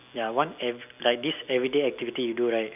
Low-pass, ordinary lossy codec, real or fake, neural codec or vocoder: 3.6 kHz; none; real; none